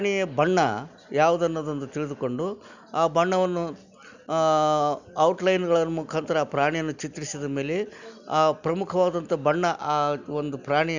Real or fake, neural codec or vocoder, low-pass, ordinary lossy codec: real; none; 7.2 kHz; none